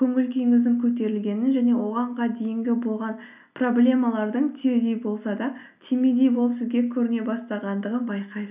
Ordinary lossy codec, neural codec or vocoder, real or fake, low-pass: none; none; real; 3.6 kHz